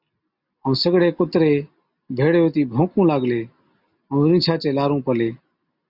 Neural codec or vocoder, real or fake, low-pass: none; real; 5.4 kHz